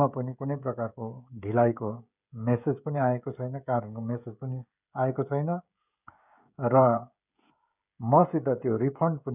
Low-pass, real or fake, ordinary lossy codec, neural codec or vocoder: 3.6 kHz; fake; none; codec, 16 kHz, 16 kbps, FreqCodec, smaller model